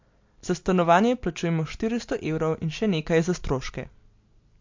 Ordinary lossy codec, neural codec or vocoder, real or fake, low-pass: MP3, 48 kbps; none; real; 7.2 kHz